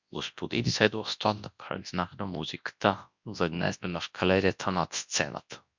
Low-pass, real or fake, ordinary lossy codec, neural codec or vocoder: 7.2 kHz; fake; MP3, 64 kbps; codec, 24 kHz, 0.9 kbps, WavTokenizer, large speech release